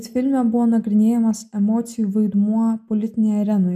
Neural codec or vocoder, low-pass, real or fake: none; 14.4 kHz; real